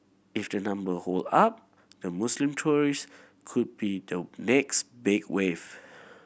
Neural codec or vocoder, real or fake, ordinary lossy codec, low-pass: none; real; none; none